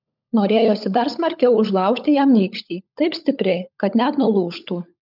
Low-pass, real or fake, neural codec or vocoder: 5.4 kHz; fake; codec, 16 kHz, 16 kbps, FunCodec, trained on LibriTTS, 50 frames a second